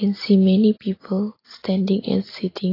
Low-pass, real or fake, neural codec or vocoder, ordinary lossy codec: 5.4 kHz; real; none; AAC, 24 kbps